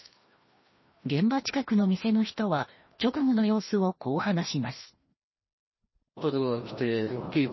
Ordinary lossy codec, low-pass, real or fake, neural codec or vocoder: MP3, 24 kbps; 7.2 kHz; fake; codec, 16 kHz, 1 kbps, FreqCodec, larger model